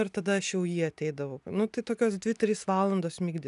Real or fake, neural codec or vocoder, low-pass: real; none; 10.8 kHz